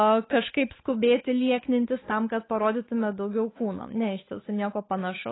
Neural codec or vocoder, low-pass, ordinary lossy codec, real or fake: codec, 16 kHz, 8 kbps, FunCodec, trained on Chinese and English, 25 frames a second; 7.2 kHz; AAC, 16 kbps; fake